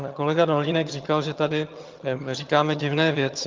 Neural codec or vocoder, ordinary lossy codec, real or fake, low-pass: vocoder, 22.05 kHz, 80 mel bands, HiFi-GAN; Opus, 24 kbps; fake; 7.2 kHz